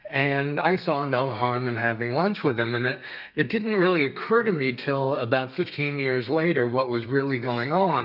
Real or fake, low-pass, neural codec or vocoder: fake; 5.4 kHz; codec, 32 kHz, 1.9 kbps, SNAC